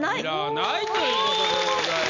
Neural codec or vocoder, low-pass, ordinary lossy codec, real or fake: none; 7.2 kHz; none; real